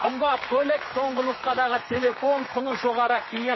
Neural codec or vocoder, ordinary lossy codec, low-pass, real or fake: codec, 32 kHz, 1.9 kbps, SNAC; MP3, 24 kbps; 7.2 kHz; fake